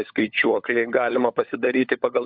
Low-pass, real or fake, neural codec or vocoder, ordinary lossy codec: 5.4 kHz; fake; codec, 16 kHz, 16 kbps, FunCodec, trained on LibriTTS, 50 frames a second; MP3, 48 kbps